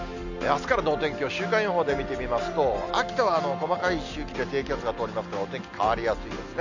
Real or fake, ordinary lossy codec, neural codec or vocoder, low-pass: real; none; none; 7.2 kHz